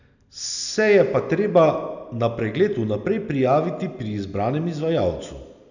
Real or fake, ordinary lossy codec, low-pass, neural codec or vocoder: real; none; 7.2 kHz; none